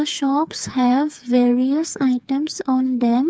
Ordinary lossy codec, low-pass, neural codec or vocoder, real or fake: none; none; codec, 16 kHz, 4 kbps, FreqCodec, smaller model; fake